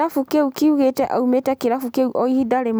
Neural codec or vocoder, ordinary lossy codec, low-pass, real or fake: none; none; none; real